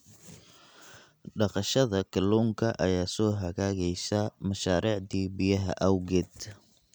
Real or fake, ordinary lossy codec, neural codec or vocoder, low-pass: real; none; none; none